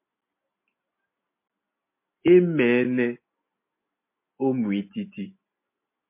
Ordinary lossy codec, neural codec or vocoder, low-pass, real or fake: MP3, 24 kbps; none; 3.6 kHz; real